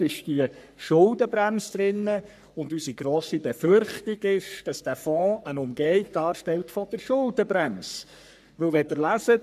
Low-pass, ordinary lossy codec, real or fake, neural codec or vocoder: 14.4 kHz; none; fake; codec, 44.1 kHz, 3.4 kbps, Pupu-Codec